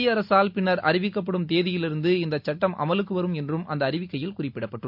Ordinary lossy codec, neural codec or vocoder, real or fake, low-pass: MP3, 48 kbps; none; real; 5.4 kHz